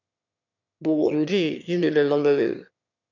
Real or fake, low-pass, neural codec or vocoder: fake; 7.2 kHz; autoencoder, 22.05 kHz, a latent of 192 numbers a frame, VITS, trained on one speaker